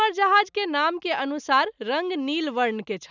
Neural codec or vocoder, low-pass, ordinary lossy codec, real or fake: none; 7.2 kHz; none; real